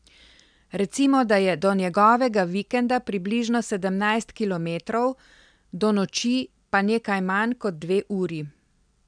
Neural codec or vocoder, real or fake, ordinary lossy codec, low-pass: none; real; none; 9.9 kHz